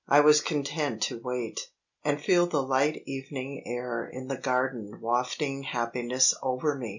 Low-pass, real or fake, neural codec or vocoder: 7.2 kHz; real; none